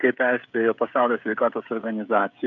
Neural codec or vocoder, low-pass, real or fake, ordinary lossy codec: codec, 16 kHz, 16 kbps, FreqCodec, smaller model; 7.2 kHz; fake; AAC, 64 kbps